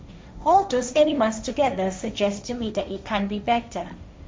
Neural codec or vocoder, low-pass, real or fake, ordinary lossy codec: codec, 16 kHz, 1.1 kbps, Voila-Tokenizer; none; fake; none